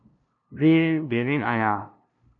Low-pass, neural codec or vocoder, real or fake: 7.2 kHz; codec, 16 kHz, 0.5 kbps, FunCodec, trained on LibriTTS, 25 frames a second; fake